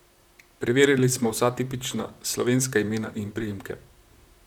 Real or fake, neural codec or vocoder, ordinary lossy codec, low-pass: fake; vocoder, 44.1 kHz, 128 mel bands, Pupu-Vocoder; none; 19.8 kHz